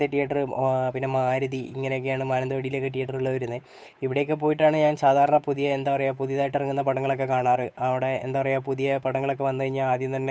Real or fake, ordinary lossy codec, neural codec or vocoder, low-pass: fake; none; codec, 16 kHz, 8 kbps, FunCodec, trained on Chinese and English, 25 frames a second; none